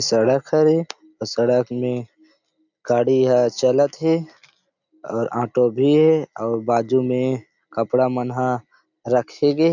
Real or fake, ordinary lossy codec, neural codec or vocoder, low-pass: real; none; none; 7.2 kHz